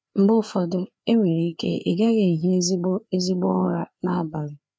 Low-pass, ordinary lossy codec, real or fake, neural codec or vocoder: none; none; fake; codec, 16 kHz, 4 kbps, FreqCodec, larger model